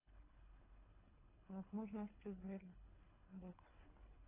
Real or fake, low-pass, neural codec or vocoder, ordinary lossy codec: fake; 3.6 kHz; codec, 24 kHz, 3 kbps, HILCodec; AAC, 16 kbps